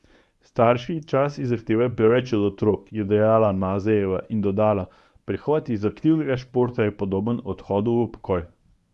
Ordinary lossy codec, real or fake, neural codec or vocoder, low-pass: none; fake; codec, 24 kHz, 0.9 kbps, WavTokenizer, medium speech release version 1; none